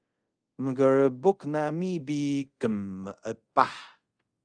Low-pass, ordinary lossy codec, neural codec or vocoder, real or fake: 9.9 kHz; Opus, 24 kbps; codec, 24 kHz, 0.5 kbps, DualCodec; fake